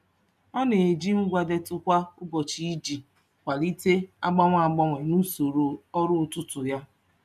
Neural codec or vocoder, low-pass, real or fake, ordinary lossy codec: none; 14.4 kHz; real; none